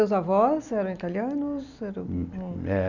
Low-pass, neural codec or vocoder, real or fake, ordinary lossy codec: 7.2 kHz; none; real; none